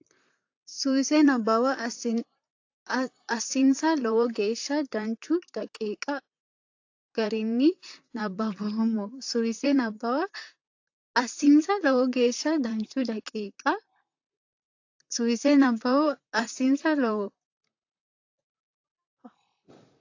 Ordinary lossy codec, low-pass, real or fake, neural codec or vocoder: AAC, 48 kbps; 7.2 kHz; fake; vocoder, 44.1 kHz, 128 mel bands, Pupu-Vocoder